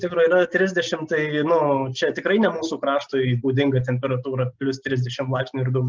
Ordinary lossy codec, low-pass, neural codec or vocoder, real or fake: Opus, 24 kbps; 7.2 kHz; none; real